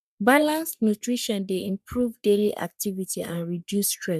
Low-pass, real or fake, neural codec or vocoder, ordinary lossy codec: 14.4 kHz; fake; codec, 44.1 kHz, 3.4 kbps, Pupu-Codec; MP3, 96 kbps